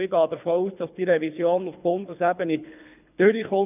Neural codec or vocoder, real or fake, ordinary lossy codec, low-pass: codec, 24 kHz, 3 kbps, HILCodec; fake; none; 3.6 kHz